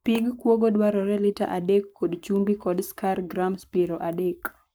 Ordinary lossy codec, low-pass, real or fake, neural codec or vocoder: none; none; fake; codec, 44.1 kHz, 7.8 kbps, Pupu-Codec